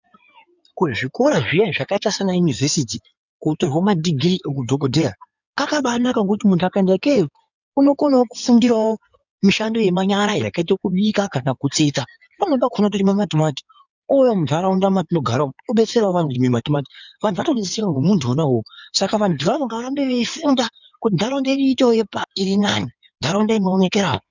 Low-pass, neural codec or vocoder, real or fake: 7.2 kHz; codec, 16 kHz in and 24 kHz out, 2.2 kbps, FireRedTTS-2 codec; fake